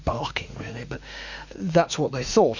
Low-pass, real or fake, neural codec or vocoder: 7.2 kHz; fake; autoencoder, 48 kHz, 32 numbers a frame, DAC-VAE, trained on Japanese speech